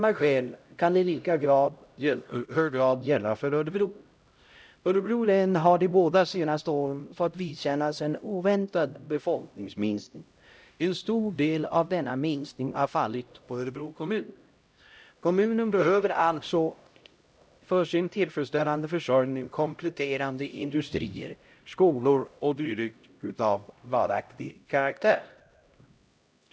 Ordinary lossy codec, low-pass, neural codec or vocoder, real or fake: none; none; codec, 16 kHz, 0.5 kbps, X-Codec, HuBERT features, trained on LibriSpeech; fake